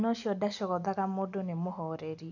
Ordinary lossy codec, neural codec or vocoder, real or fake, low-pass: none; none; real; 7.2 kHz